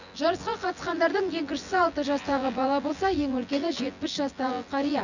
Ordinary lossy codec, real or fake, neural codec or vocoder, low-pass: none; fake; vocoder, 24 kHz, 100 mel bands, Vocos; 7.2 kHz